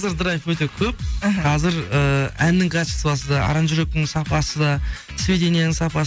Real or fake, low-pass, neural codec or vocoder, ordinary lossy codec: real; none; none; none